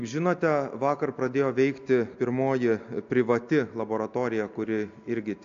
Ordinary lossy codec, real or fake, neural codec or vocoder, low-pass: AAC, 64 kbps; real; none; 7.2 kHz